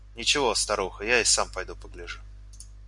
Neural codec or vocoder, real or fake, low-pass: none; real; 10.8 kHz